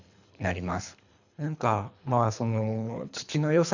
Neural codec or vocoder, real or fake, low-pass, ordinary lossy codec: codec, 24 kHz, 3 kbps, HILCodec; fake; 7.2 kHz; none